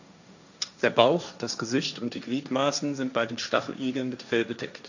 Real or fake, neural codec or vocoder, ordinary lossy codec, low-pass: fake; codec, 16 kHz, 1.1 kbps, Voila-Tokenizer; none; 7.2 kHz